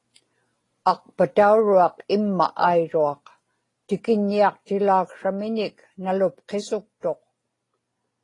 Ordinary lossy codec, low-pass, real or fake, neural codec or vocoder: AAC, 32 kbps; 10.8 kHz; real; none